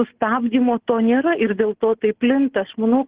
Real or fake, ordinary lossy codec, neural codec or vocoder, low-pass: real; Opus, 16 kbps; none; 3.6 kHz